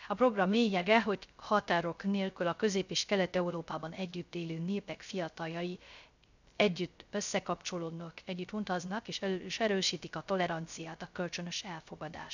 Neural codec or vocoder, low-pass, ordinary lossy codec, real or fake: codec, 16 kHz, 0.3 kbps, FocalCodec; 7.2 kHz; none; fake